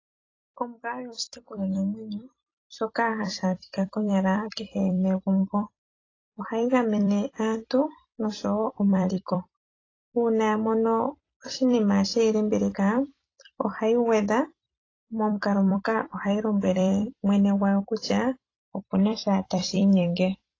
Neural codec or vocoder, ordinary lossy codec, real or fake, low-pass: vocoder, 44.1 kHz, 128 mel bands every 256 samples, BigVGAN v2; AAC, 32 kbps; fake; 7.2 kHz